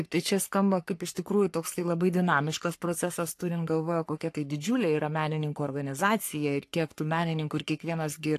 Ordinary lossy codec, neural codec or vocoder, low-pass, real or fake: AAC, 64 kbps; codec, 44.1 kHz, 3.4 kbps, Pupu-Codec; 14.4 kHz; fake